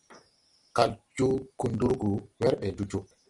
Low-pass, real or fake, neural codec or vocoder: 10.8 kHz; real; none